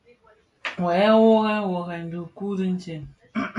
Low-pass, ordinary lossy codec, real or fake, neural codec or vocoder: 10.8 kHz; AAC, 48 kbps; real; none